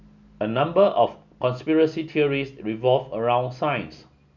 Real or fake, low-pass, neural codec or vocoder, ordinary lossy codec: real; 7.2 kHz; none; none